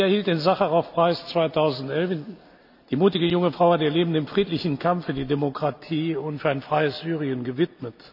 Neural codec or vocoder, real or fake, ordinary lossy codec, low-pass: none; real; none; 5.4 kHz